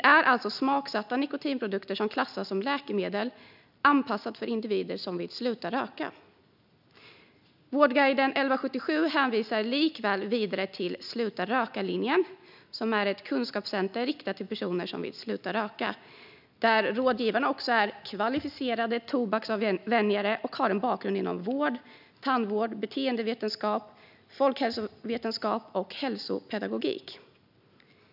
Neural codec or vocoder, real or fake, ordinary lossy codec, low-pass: none; real; none; 5.4 kHz